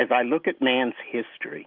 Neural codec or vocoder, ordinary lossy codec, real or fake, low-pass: none; Opus, 32 kbps; real; 5.4 kHz